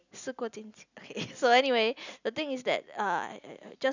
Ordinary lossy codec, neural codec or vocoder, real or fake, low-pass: none; none; real; 7.2 kHz